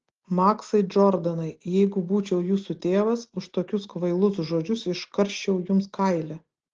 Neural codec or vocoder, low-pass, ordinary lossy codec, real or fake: none; 7.2 kHz; Opus, 16 kbps; real